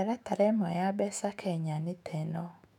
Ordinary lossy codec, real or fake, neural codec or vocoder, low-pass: none; fake; autoencoder, 48 kHz, 128 numbers a frame, DAC-VAE, trained on Japanese speech; 19.8 kHz